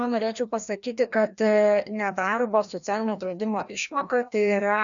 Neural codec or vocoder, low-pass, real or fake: codec, 16 kHz, 1 kbps, FreqCodec, larger model; 7.2 kHz; fake